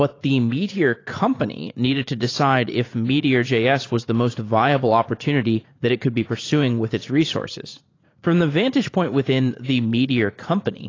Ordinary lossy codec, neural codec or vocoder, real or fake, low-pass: AAC, 32 kbps; vocoder, 44.1 kHz, 80 mel bands, Vocos; fake; 7.2 kHz